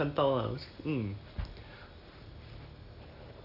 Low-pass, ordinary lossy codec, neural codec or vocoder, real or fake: 5.4 kHz; none; none; real